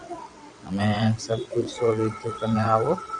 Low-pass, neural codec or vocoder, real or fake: 9.9 kHz; vocoder, 22.05 kHz, 80 mel bands, WaveNeXt; fake